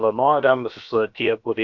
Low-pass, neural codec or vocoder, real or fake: 7.2 kHz; codec, 16 kHz, about 1 kbps, DyCAST, with the encoder's durations; fake